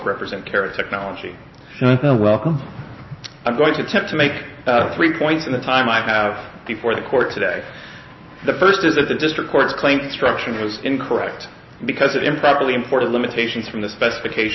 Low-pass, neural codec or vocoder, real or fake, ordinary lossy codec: 7.2 kHz; none; real; MP3, 24 kbps